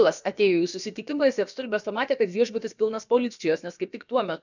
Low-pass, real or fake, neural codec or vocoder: 7.2 kHz; fake; codec, 16 kHz, about 1 kbps, DyCAST, with the encoder's durations